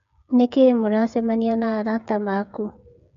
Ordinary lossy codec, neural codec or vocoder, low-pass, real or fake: none; codec, 16 kHz, 8 kbps, FreqCodec, smaller model; 7.2 kHz; fake